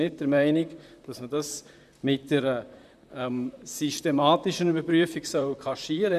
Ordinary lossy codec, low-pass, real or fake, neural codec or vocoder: none; 14.4 kHz; fake; vocoder, 44.1 kHz, 128 mel bands, Pupu-Vocoder